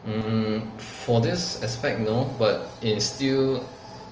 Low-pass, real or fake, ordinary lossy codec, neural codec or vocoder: 7.2 kHz; real; Opus, 24 kbps; none